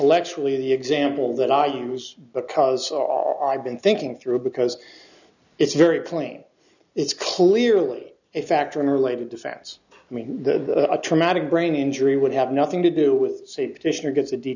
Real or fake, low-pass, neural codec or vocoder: real; 7.2 kHz; none